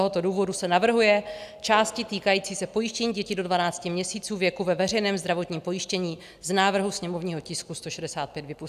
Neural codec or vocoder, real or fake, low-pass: none; real; 14.4 kHz